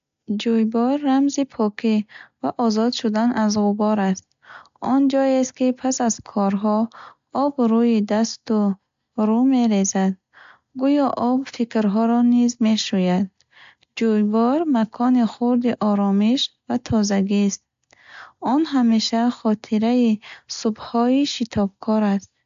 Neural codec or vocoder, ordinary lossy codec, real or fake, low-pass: none; AAC, 64 kbps; real; 7.2 kHz